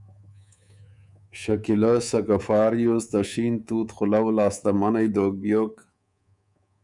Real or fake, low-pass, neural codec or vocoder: fake; 10.8 kHz; codec, 24 kHz, 3.1 kbps, DualCodec